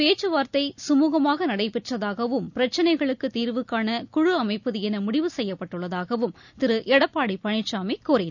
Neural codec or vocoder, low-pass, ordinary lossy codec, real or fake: none; 7.2 kHz; none; real